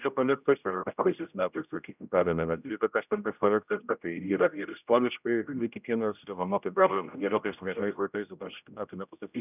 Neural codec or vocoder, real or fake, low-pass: codec, 16 kHz, 0.5 kbps, X-Codec, HuBERT features, trained on general audio; fake; 3.6 kHz